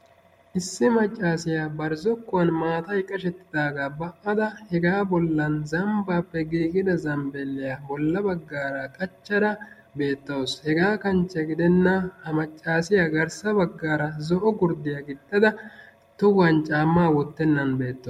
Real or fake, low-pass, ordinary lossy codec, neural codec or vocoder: real; 19.8 kHz; MP3, 64 kbps; none